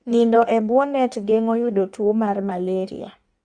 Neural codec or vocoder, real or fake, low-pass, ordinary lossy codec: codec, 16 kHz in and 24 kHz out, 1.1 kbps, FireRedTTS-2 codec; fake; 9.9 kHz; Opus, 64 kbps